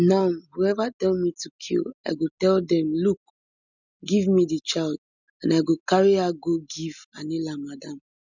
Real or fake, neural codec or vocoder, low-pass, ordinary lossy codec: real; none; 7.2 kHz; none